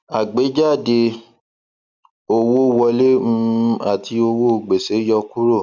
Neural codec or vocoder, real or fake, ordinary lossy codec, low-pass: none; real; none; 7.2 kHz